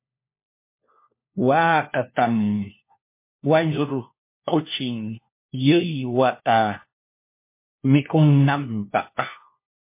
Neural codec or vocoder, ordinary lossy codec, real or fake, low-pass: codec, 16 kHz, 1 kbps, FunCodec, trained on LibriTTS, 50 frames a second; MP3, 24 kbps; fake; 3.6 kHz